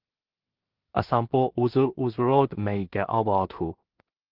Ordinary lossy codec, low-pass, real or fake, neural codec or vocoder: Opus, 16 kbps; 5.4 kHz; fake; codec, 16 kHz in and 24 kHz out, 0.4 kbps, LongCat-Audio-Codec, two codebook decoder